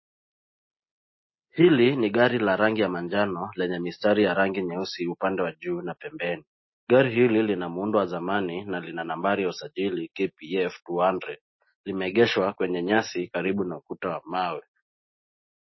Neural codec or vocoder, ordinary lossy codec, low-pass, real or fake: none; MP3, 24 kbps; 7.2 kHz; real